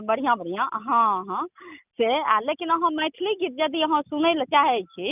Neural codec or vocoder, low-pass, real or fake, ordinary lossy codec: none; 3.6 kHz; real; none